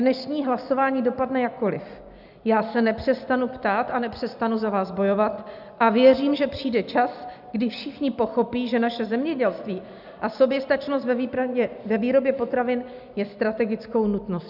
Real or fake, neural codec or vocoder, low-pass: real; none; 5.4 kHz